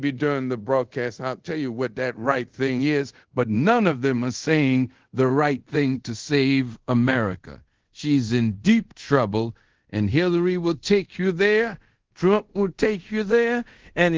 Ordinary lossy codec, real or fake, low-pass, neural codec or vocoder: Opus, 16 kbps; fake; 7.2 kHz; codec, 24 kHz, 0.5 kbps, DualCodec